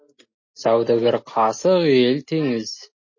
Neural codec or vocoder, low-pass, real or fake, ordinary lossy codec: none; 7.2 kHz; real; MP3, 32 kbps